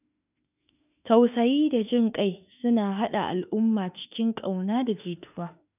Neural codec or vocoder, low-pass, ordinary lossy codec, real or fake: autoencoder, 48 kHz, 32 numbers a frame, DAC-VAE, trained on Japanese speech; 3.6 kHz; none; fake